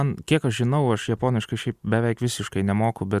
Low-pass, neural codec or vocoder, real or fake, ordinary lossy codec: 14.4 kHz; none; real; Opus, 64 kbps